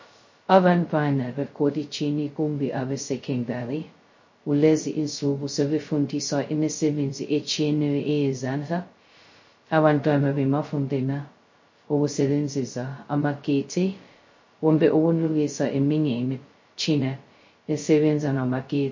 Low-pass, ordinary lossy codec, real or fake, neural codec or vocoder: 7.2 kHz; MP3, 32 kbps; fake; codec, 16 kHz, 0.2 kbps, FocalCodec